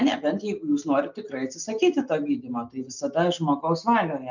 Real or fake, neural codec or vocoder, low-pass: real; none; 7.2 kHz